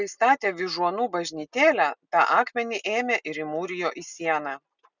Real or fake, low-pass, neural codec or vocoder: real; 7.2 kHz; none